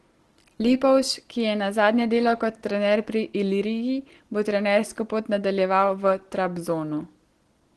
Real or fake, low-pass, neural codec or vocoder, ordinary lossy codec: real; 10.8 kHz; none; Opus, 16 kbps